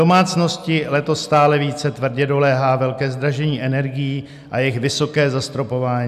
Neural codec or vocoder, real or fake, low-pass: none; real; 14.4 kHz